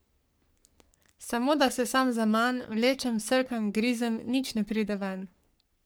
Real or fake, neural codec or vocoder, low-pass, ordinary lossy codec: fake; codec, 44.1 kHz, 3.4 kbps, Pupu-Codec; none; none